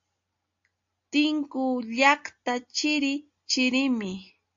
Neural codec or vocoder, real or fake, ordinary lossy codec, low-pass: none; real; AAC, 64 kbps; 7.2 kHz